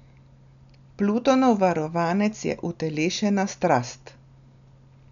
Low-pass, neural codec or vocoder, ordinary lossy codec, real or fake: 7.2 kHz; none; none; real